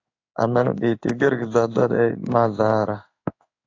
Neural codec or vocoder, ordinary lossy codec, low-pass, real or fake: codec, 16 kHz in and 24 kHz out, 1 kbps, XY-Tokenizer; AAC, 48 kbps; 7.2 kHz; fake